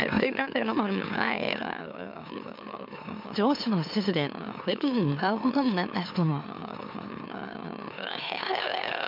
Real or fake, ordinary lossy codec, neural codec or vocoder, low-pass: fake; MP3, 48 kbps; autoencoder, 44.1 kHz, a latent of 192 numbers a frame, MeloTTS; 5.4 kHz